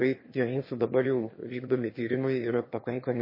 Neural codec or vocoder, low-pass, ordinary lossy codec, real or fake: autoencoder, 22.05 kHz, a latent of 192 numbers a frame, VITS, trained on one speaker; 5.4 kHz; MP3, 24 kbps; fake